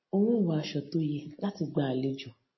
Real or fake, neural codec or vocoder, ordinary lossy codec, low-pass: real; none; MP3, 24 kbps; 7.2 kHz